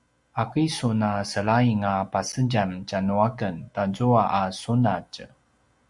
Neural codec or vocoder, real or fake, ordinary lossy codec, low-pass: none; real; Opus, 64 kbps; 10.8 kHz